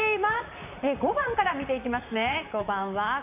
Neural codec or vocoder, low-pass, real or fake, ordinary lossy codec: vocoder, 44.1 kHz, 128 mel bands, Pupu-Vocoder; 3.6 kHz; fake; MP3, 32 kbps